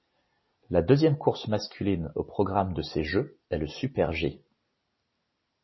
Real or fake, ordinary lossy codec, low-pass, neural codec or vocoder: real; MP3, 24 kbps; 7.2 kHz; none